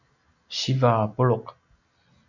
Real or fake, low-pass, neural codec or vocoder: real; 7.2 kHz; none